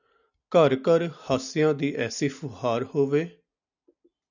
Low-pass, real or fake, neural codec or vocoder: 7.2 kHz; real; none